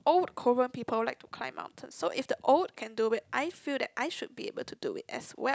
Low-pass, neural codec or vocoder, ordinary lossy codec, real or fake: none; none; none; real